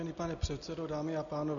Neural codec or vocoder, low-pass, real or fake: none; 7.2 kHz; real